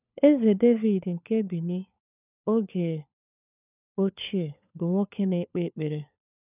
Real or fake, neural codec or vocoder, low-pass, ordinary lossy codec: fake; codec, 16 kHz, 4 kbps, FunCodec, trained on LibriTTS, 50 frames a second; 3.6 kHz; none